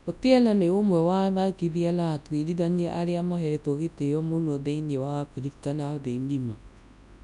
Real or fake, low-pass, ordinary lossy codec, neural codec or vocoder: fake; 10.8 kHz; none; codec, 24 kHz, 0.9 kbps, WavTokenizer, large speech release